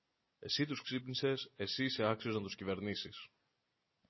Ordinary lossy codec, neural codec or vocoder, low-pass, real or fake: MP3, 24 kbps; none; 7.2 kHz; real